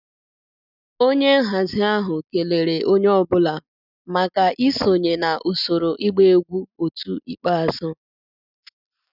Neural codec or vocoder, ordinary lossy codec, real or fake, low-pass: none; none; real; 5.4 kHz